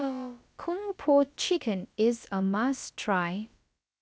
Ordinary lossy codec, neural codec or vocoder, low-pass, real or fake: none; codec, 16 kHz, about 1 kbps, DyCAST, with the encoder's durations; none; fake